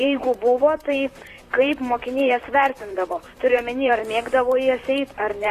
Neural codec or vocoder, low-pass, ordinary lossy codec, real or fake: vocoder, 44.1 kHz, 128 mel bands, Pupu-Vocoder; 14.4 kHz; AAC, 48 kbps; fake